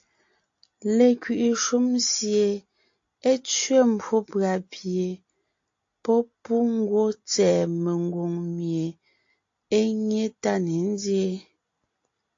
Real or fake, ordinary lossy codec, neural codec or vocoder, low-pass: real; AAC, 48 kbps; none; 7.2 kHz